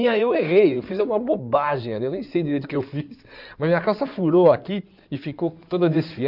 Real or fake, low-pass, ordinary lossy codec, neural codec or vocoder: fake; 5.4 kHz; none; codec, 16 kHz in and 24 kHz out, 2.2 kbps, FireRedTTS-2 codec